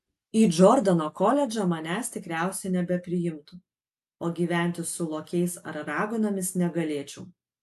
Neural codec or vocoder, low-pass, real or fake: none; 14.4 kHz; real